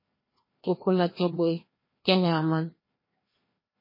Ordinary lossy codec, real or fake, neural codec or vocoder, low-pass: MP3, 24 kbps; fake; codec, 16 kHz, 1 kbps, FreqCodec, larger model; 5.4 kHz